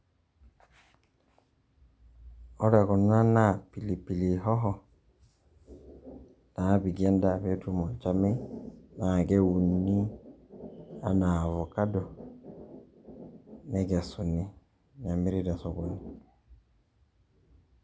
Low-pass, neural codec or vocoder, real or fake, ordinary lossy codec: none; none; real; none